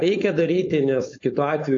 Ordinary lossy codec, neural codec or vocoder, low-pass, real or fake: AAC, 48 kbps; codec, 16 kHz, 4.8 kbps, FACodec; 7.2 kHz; fake